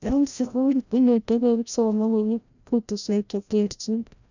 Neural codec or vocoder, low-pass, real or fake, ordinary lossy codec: codec, 16 kHz, 0.5 kbps, FreqCodec, larger model; 7.2 kHz; fake; none